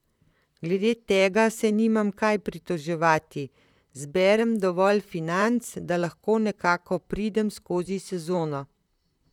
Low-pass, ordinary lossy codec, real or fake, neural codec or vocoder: 19.8 kHz; none; fake; vocoder, 44.1 kHz, 128 mel bands, Pupu-Vocoder